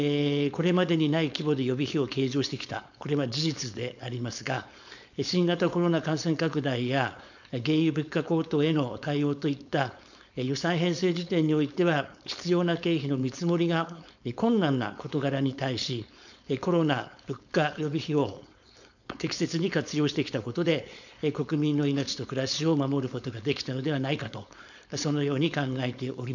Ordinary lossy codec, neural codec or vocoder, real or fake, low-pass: none; codec, 16 kHz, 4.8 kbps, FACodec; fake; 7.2 kHz